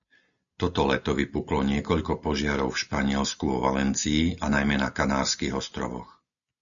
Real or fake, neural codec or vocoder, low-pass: real; none; 7.2 kHz